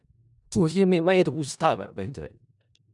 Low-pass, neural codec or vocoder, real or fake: 10.8 kHz; codec, 16 kHz in and 24 kHz out, 0.4 kbps, LongCat-Audio-Codec, four codebook decoder; fake